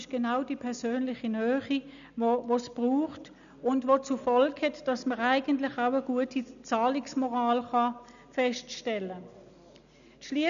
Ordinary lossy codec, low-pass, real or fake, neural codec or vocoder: none; 7.2 kHz; real; none